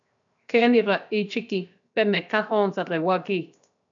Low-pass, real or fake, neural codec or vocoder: 7.2 kHz; fake; codec, 16 kHz, 0.7 kbps, FocalCodec